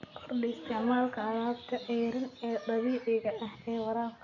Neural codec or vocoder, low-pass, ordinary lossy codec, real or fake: none; 7.2 kHz; none; real